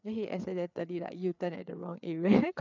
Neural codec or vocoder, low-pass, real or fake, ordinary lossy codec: codec, 16 kHz, 4 kbps, FreqCodec, larger model; 7.2 kHz; fake; none